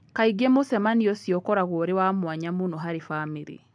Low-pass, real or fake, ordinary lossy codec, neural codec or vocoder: 9.9 kHz; real; AAC, 64 kbps; none